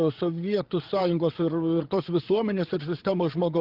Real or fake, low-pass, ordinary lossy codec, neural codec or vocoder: fake; 5.4 kHz; Opus, 16 kbps; codec, 16 kHz, 8 kbps, FreqCodec, larger model